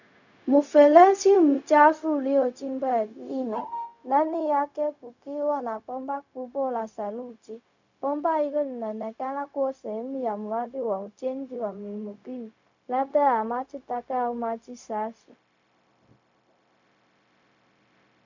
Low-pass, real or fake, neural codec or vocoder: 7.2 kHz; fake; codec, 16 kHz, 0.4 kbps, LongCat-Audio-Codec